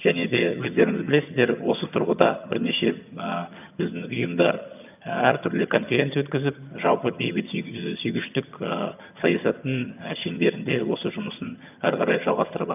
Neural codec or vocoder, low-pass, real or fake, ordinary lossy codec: vocoder, 22.05 kHz, 80 mel bands, HiFi-GAN; 3.6 kHz; fake; none